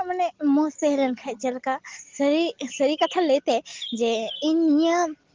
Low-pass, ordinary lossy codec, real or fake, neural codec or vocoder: 7.2 kHz; Opus, 16 kbps; real; none